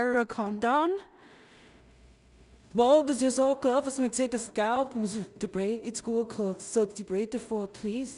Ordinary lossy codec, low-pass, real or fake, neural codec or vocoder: none; 10.8 kHz; fake; codec, 16 kHz in and 24 kHz out, 0.4 kbps, LongCat-Audio-Codec, two codebook decoder